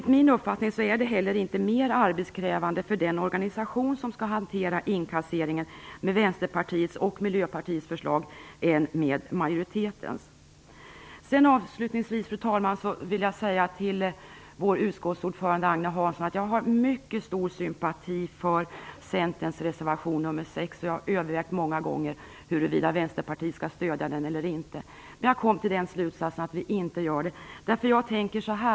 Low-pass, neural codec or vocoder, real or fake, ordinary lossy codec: none; none; real; none